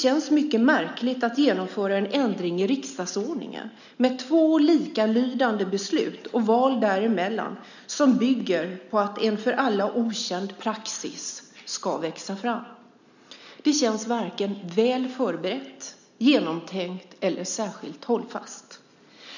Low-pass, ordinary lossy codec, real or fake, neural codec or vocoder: 7.2 kHz; none; real; none